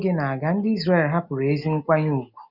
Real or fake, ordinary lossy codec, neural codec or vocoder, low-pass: real; none; none; 5.4 kHz